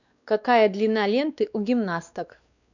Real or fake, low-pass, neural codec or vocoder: fake; 7.2 kHz; codec, 16 kHz, 2 kbps, X-Codec, WavLM features, trained on Multilingual LibriSpeech